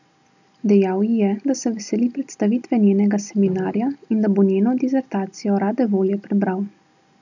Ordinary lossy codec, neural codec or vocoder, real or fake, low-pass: none; none; real; 7.2 kHz